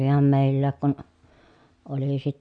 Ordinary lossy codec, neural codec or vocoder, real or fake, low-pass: none; none; real; 9.9 kHz